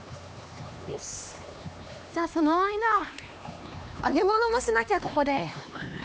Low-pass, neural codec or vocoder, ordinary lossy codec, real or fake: none; codec, 16 kHz, 2 kbps, X-Codec, HuBERT features, trained on LibriSpeech; none; fake